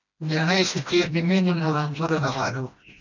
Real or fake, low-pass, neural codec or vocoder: fake; 7.2 kHz; codec, 16 kHz, 1 kbps, FreqCodec, smaller model